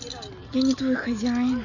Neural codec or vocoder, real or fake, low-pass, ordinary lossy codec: none; real; 7.2 kHz; none